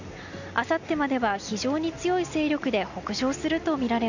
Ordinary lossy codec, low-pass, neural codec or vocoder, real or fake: none; 7.2 kHz; none; real